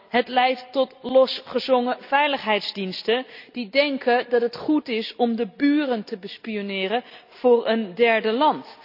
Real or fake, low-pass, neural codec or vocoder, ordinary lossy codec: real; 5.4 kHz; none; none